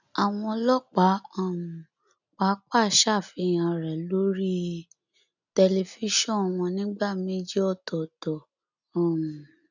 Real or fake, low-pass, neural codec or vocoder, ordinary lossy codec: real; 7.2 kHz; none; none